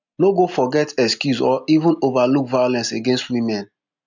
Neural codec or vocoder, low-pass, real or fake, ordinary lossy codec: none; 7.2 kHz; real; none